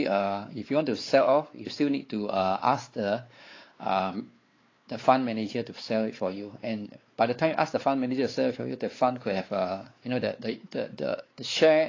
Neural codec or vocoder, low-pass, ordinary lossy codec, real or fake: codec, 16 kHz, 4 kbps, X-Codec, WavLM features, trained on Multilingual LibriSpeech; 7.2 kHz; AAC, 32 kbps; fake